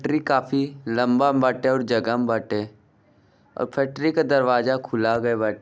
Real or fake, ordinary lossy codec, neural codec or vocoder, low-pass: real; none; none; none